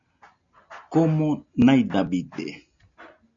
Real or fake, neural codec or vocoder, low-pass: real; none; 7.2 kHz